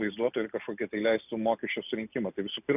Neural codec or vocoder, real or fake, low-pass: none; real; 3.6 kHz